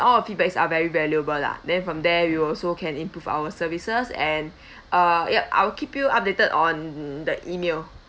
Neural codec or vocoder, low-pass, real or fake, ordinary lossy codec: none; none; real; none